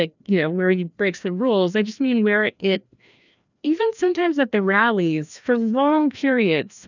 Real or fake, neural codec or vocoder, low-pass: fake; codec, 16 kHz, 1 kbps, FreqCodec, larger model; 7.2 kHz